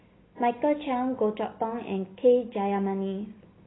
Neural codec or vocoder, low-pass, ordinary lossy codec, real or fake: none; 7.2 kHz; AAC, 16 kbps; real